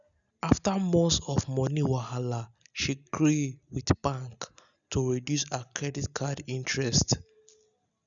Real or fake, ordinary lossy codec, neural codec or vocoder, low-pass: real; none; none; 7.2 kHz